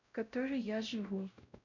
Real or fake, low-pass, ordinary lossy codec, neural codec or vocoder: fake; 7.2 kHz; none; codec, 16 kHz, 0.5 kbps, X-Codec, WavLM features, trained on Multilingual LibriSpeech